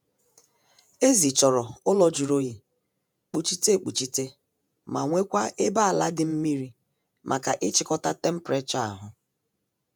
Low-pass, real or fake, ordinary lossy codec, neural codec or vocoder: none; fake; none; vocoder, 48 kHz, 128 mel bands, Vocos